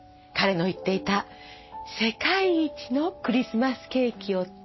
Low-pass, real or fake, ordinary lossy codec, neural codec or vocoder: 7.2 kHz; real; MP3, 24 kbps; none